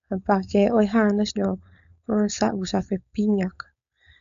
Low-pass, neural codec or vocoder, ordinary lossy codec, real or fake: 7.2 kHz; codec, 16 kHz, 4.8 kbps, FACodec; none; fake